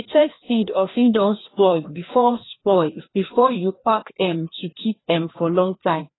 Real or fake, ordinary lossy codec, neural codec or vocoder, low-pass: fake; AAC, 16 kbps; codec, 16 kHz, 1 kbps, FreqCodec, larger model; 7.2 kHz